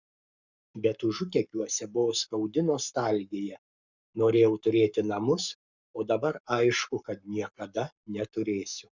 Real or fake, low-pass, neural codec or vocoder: fake; 7.2 kHz; codec, 44.1 kHz, 7.8 kbps, Pupu-Codec